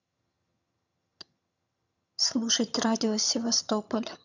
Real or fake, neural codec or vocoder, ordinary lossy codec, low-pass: fake; vocoder, 22.05 kHz, 80 mel bands, HiFi-GAN; none; 7.2 kHz